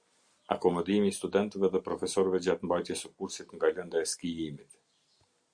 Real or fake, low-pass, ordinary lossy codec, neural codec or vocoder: real; 9.9 kHz; Opus, 64 kbps; none